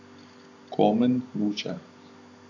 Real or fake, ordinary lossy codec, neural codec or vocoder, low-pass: real; AAC, 48 kbps; none; 7.2 kHz